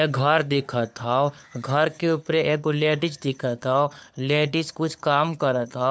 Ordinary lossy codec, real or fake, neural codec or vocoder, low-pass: none; fake; codec, 16 kHz, 4 kbps, FunCodec, trained on LibriTTS, 50 frames a second; none